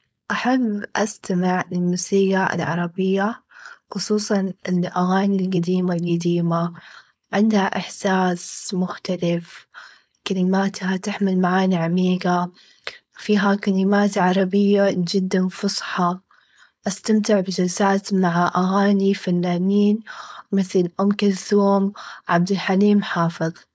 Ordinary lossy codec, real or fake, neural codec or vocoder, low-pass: none; fake; codec, 16 kHz, 4.8 kbps, FACodec; none